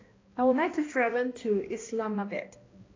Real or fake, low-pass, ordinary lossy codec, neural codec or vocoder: fake; 7.2 kHz; AAC, 32 kbps; codec, 16 kHz, 1 kbps, X-Codec, HuBERT features, trained on balanced general audio